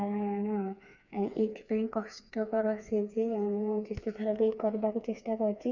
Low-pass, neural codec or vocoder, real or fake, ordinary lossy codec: 7.2 kHz; codec, 16 kHz, 4 kbps, FreqCodec, smaller model; fake; none